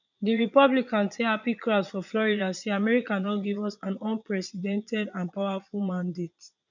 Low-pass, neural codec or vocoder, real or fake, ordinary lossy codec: 7.2 kHz; vocoder, 22.05 kHz, 80 mel bands, Vocos; fake; none